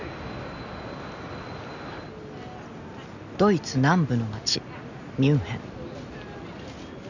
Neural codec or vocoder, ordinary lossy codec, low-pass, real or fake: none; none; 7.2 kHz; real